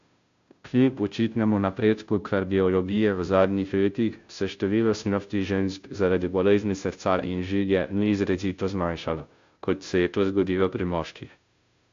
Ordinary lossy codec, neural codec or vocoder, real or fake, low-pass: AAC, 64 kbps; codec, 16 kHz, 0.5 kbps, FunCodec, trained on Chinese and English, 25 frames a second; fake; 7.2 kHz